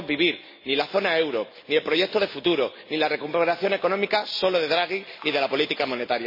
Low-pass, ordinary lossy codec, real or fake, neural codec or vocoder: 5.4 kHz; MP3, 24 kbps; real; none